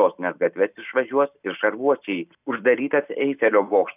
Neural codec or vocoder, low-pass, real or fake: none; 3.6 kHz; real